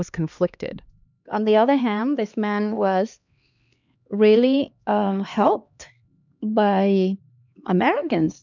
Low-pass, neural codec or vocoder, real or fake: 7.2 kHz; codec, 16 kHz, 2 kbps, X-Codec, HuBERT features, trained on LibriSpeech; fake